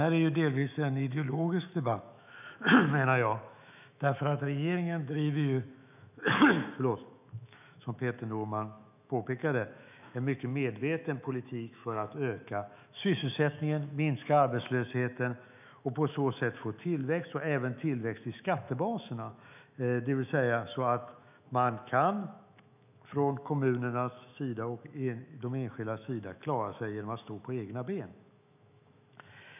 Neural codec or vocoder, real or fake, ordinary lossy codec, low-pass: autoencoder, 48 kHz, 128 numbers a frame, DAC-VAE, trained on Japanese speech; fake; none; 3.6 kHz